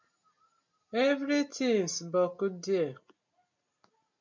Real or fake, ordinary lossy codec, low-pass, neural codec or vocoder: real; MP3, 64 kbps; 7.2 kHz; none